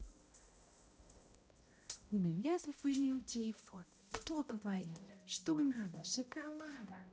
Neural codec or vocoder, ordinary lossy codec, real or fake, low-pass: codec, 16 kHz, 0.5 kbps, X-Codec, HuBERT features, trained on balanced general audio; none; fake; none